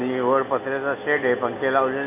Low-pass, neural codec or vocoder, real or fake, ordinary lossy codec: 3.6 kHz; none; real; none